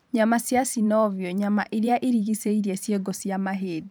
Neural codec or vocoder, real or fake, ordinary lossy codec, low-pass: vocoder, 44.1 kHz, 128 mel bands every 512 samples, BigVGAN v2; fake; none; none